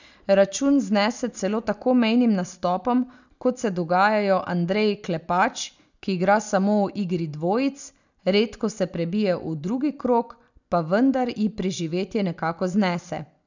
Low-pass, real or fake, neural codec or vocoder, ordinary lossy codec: 7.2 kHz; real; none; none